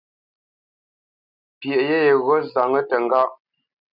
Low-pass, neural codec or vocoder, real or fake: 5.4 kHz; none; real